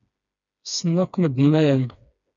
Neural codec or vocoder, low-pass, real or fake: codec, 16 kHz, 2 kbps, FreqCodec, smaller model; 7.2 kHz; fake